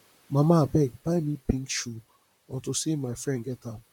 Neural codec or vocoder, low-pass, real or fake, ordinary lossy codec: vocoder, 44.1 kHz, 128 mel bands, Pupu-Vocoder; 19.8 kHz; fake; MP3, 96 kbps